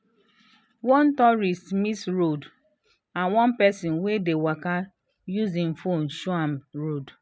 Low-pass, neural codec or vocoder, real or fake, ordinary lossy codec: none; none; real; none